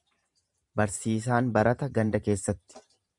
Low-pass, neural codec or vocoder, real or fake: 10.8 kHz; vocoder, 44.1 kHz, 128 mel bands every 512 samples, BigVGAN v2; fake